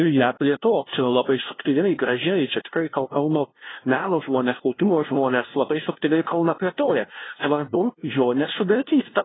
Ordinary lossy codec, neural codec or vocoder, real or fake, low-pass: AAC, 16 kbps; codec, 16 kHz, 0.5 kbps, FunCodec, trained on LibriTTS, 25 frames a second; fake; 7.2 kHz